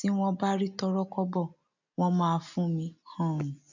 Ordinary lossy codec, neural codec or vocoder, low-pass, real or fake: none; none; 7.2 kHz; real